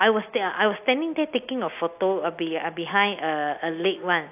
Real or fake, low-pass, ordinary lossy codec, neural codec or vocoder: real; 3.6 kHz; AAC, 32 kbps; none